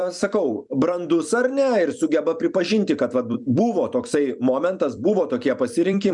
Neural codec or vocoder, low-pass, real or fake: vocoder, 44.1 kHz, 128 mel bands every 256 samples, BigVGAN v2; 10.8 kHz; fake